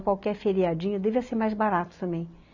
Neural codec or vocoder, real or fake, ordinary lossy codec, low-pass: none; real; none; 7.2 kHz